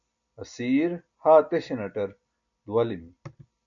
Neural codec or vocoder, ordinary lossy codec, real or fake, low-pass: none; AAC, 64 kbps; real; 7.2 kHz